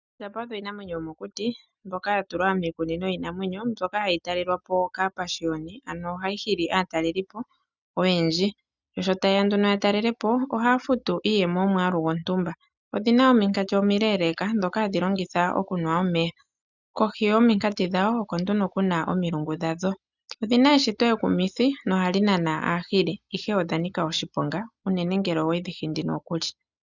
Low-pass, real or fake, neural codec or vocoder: 7.2 kHz; real; none